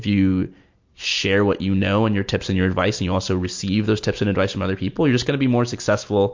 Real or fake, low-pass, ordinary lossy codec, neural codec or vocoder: real; 7.2 kHz; MP3, 48 kbps; none